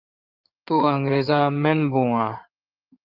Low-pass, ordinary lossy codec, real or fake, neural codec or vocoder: 5.4 kHz; Opus, 32 kbps; fake; codec, 16 kHz in and 24 kHz out, 2.2 kbps, FireRedTTS-2 codec